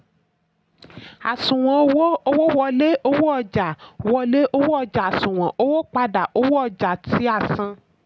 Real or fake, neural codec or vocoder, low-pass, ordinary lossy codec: real; none; none; none